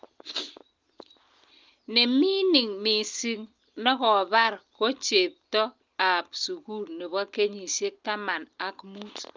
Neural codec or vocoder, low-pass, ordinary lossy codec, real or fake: none; 7.2 kHz; Opus, 24 kbps; real